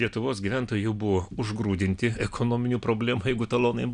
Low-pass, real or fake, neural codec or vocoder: 9.9 kHz; real; none